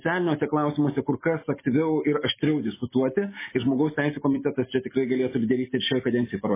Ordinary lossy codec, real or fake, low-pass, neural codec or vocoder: MP3, 16 kbps; real; 3.6 kHz; none